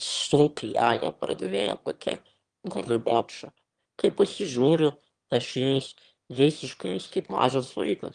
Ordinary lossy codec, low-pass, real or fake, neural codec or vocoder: Opus, 24 kbps; 9.9 kHz; fake; autoencoder, 22.05 kHz, a latent of 192 numbers a frame, VITS, trained on one speaker